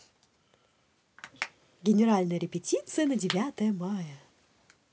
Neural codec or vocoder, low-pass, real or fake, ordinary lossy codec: none; none; real; none